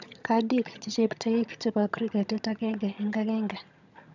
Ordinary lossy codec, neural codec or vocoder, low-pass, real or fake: none; vocoder, 22.05 kHz, 80 mel bands, HiFi-GAN; 7.2 kHz; fake